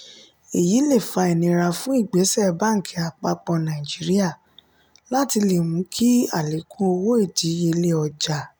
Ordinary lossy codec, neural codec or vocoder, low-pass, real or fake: none; none; none; real